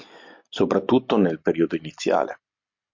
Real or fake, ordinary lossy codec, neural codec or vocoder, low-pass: real; MP3, 48 kbps; none; 7.2 kHz